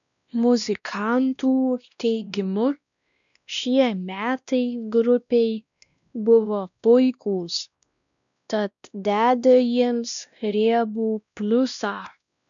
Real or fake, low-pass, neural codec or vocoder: fake; 7.2 kHz; codec, 16 kHz, 1 kbps, X-Codec, WavLM features, trained on Multilingual LibriSpeech